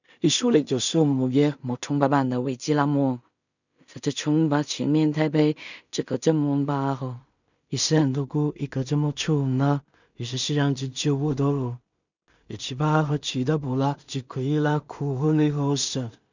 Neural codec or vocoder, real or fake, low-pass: codec, 16 kHz in and 24 kHz out, 0.4 kbps, LongCat-Audio-Codec, two codebook decoder; fake; 7.2 kHz